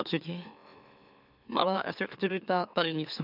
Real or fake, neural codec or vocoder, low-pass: fake; autoencoder, 44.1 kHz, a latent of 192 numbers a frame, MeloTTS; 5.4 kHz